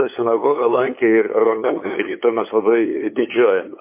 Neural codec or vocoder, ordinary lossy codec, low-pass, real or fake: codec, 16 kHz, 8 kbps, FunCodec, trained on LibriTTS, 25 frames a second; MP3, 24 kbps; 3.6 kHz; fake